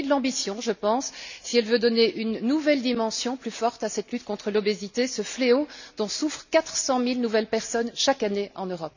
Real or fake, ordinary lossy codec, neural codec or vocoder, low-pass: real; none; none; 7.2 kHz